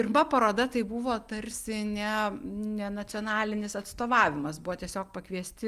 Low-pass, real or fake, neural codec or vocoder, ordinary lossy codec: 14.4 kHz; real; none; Opus, 32 kbps